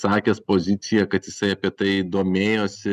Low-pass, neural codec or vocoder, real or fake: 14.4 kHz; none; real